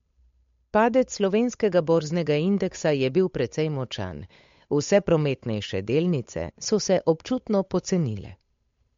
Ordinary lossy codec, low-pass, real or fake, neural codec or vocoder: MP3, 48 kbps; 7.2 kHz; fake; codec, 16 kHz, 8 kbps, FunCodec, trained on Chinese and English, 25 frames a second